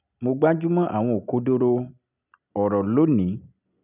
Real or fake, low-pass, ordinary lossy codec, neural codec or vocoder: real; 3.6 kHz; none; none